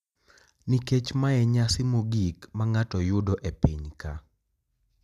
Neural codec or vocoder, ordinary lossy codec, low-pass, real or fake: none; none; 14.4 kHz; real